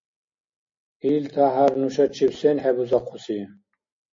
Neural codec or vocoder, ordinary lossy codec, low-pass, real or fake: none; MP3, 32 kbps; 7.2 kHz; real